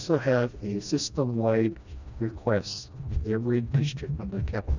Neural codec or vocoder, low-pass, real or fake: codec, 16 kHz, 1 kbps, FreqCodec, smaller model; 7.2 kHz; fake